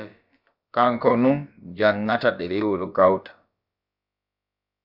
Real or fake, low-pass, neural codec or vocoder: fake; 5.4 kHz; codec, 16 kHz, about 1 kbps, DyCAST, with the encoder's durations